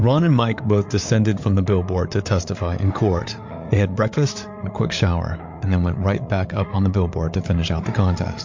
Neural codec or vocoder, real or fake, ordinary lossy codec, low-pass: codec, 16 kHz, 8 kbps, FreqCodec, larger model; fake; MP3, 48 kbps; 7.2 kHz